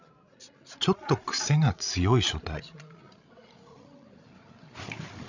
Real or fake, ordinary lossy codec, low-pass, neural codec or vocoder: fake; none; 7.2 kHz; codec, 16 kHz, 16 kbps, FreqCodec, larger model